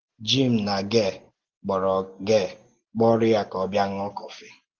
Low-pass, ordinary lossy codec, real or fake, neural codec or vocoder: 7.2 kHz; Opus, 16 kbps; real; none